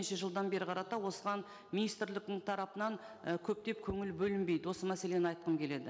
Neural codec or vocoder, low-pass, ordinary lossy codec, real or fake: none; none; none; real